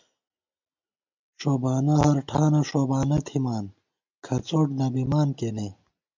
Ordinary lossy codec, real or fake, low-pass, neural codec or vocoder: MP3, 64 kbps; real; 7.2 kHz; none